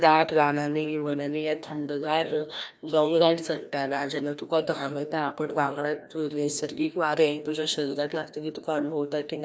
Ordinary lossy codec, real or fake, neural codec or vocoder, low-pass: none; fake; codec, 16 kHz, 1 kbps, FreqCodec, larger model; none